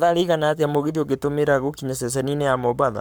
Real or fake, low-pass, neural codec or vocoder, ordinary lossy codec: fake; none; codec, 44.1 kHz, 7.8 kbps, Pupu-Codec; none